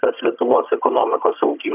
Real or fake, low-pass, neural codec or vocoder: fake; 3.6 kHz; vocoder, 22.05 kHz, 80 mel bands, HiFi-GAN